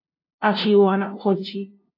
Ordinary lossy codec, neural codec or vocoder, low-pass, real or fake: AAC, 32 kbps; codec, 16 kHz, 0.5 kbps, FunCodec, trained on LibriTTS, 25 frames a second; 5.4 kHz; fake